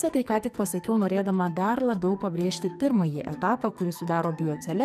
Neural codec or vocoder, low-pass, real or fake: codec, 44.1 kHz, 2.6 kbps, SNAC; 14.4 kHz; fake